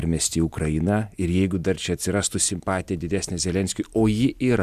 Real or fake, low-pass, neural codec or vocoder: real; 14.4 kHz; none